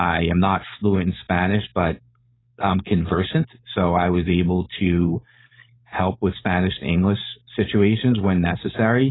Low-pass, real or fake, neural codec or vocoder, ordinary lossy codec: 7.2 kHz; fake; codec, 16 kHz, 4.8 kbps, FACodec; AAC, 16 kbps